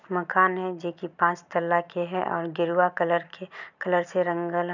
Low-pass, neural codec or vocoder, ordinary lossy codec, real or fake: 7.2 kHz; none; none; real